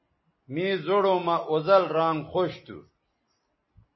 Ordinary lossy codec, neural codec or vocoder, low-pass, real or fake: MP3, 24 kbps; none; 7.2 kHz; real